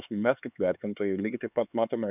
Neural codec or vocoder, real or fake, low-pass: codec, 16 kHz, 4 kbps, X-Codec, HuBERT features, trained on LibriSpeech; fake; 3.6 kHz